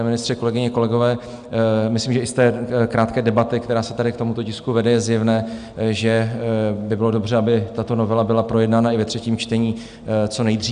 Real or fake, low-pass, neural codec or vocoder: real; 9.9 kHz; none